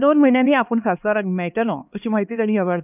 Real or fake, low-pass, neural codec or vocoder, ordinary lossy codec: fake; 3.6 kHz; codec, 16 kHz, 2 kbps, X-Codec, HuBERT features, trained on LibriSpeech; none